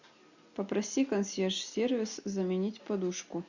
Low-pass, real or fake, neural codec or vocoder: 7.2 kHz; real; none